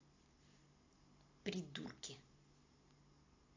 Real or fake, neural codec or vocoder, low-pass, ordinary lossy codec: real; none; 7.2 kHz; AAC, 48 kbps